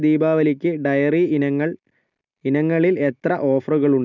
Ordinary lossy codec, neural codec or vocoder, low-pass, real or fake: none; none; 7.2 kHz; real